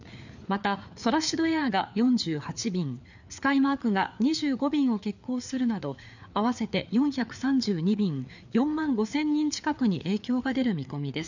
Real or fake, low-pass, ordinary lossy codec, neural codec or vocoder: fake; 7.2 kHz; none; codec, 16 kHz, 4 kbps, FreqCodec, larger model